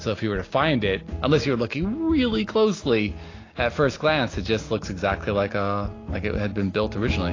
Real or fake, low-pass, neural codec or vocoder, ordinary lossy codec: real; 7.2 kHz; none; AAC, 32 kbps